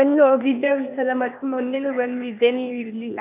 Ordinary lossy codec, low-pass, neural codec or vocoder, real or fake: none; 3.6 kHz; codec, 16 kHz, 0.8 kbps, ZipCodec; fake